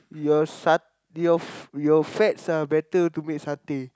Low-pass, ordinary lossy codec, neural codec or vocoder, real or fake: none; none; none; real